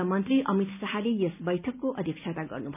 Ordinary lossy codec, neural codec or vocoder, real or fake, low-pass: none; none; real; 3.6 kHz